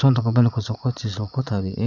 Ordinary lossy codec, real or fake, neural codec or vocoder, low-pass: none; fake; vocoder, 22.05 kHz, 80 mel bands, Vocos; 7.2 kHz